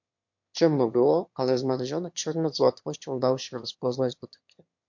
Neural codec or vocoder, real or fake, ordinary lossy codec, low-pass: autoencoder, 22.05 kHz, a latent of 192 numbers a frame, VITS, trained on one speaker; fake; MP3, 48 kbps; 7.2 kHz